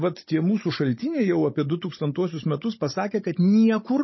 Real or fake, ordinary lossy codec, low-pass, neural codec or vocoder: fake; MP3, 24 kbps; 7.2 kHz; codec, 16 kHz, 16 kbps, FunCodec, trained on Chinese and English, 50 frames a second